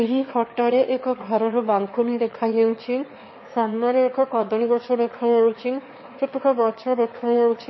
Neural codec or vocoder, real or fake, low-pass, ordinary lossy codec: autoencoder, 22.05 kHz, a latent of 192 numbers a frame, VITS, trained on one speaker; fake; 7.2 kHz; MP3, 24 kbps